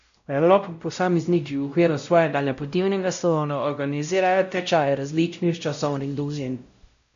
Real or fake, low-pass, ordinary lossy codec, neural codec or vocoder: fake; 7.2 kHz; MP3, 64 kbps; codec, 16 kHz, 0.5 kbps, X-Codec, WavLM features, trained on Multilingual LibriSpeech